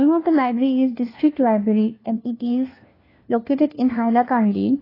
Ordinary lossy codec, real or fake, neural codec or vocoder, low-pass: AAC, 24 kbps; fake; codec, 16 kHz, 1 kbps, FunCodec, trained on LibriTTS, 50 frames a second; 5.4 kHz